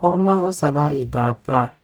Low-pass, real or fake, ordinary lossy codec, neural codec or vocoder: none; fake; none; codec, 44.1 kHz, 0.9 kbps, DAC